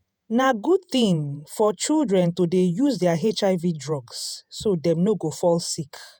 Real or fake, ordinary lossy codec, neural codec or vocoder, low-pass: fake; none; vocoder, 48 kHz, 128 mel bands, Vocos; none